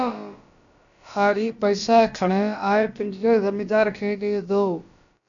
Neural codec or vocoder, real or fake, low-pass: codec, 16 kHz, about 1 kbps, DyCAST, with the encoder's durations; fake; 7.2 kHz